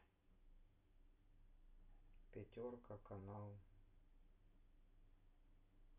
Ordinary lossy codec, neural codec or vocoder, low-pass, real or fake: none; none; 3.6 kHz; real